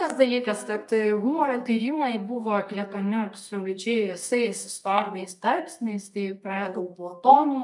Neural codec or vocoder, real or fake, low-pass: codec, 24 kHz, 0.9 kbps, WavTokenizer, medium music audio release; fake; 10.8 kHz